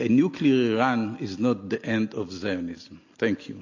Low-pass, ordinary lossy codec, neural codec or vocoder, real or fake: 7.2 kHz; AAC, 48 kbps; none; real